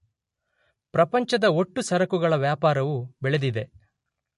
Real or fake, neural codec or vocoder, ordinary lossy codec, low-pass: real; none; MP3, 48 kbps; 14.4 kHz